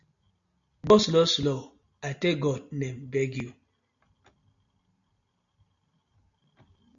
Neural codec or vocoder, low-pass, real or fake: none; 7.2 kHz; real